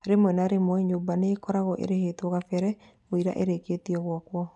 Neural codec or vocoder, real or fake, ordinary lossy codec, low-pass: none; real; none; 10.8 kHz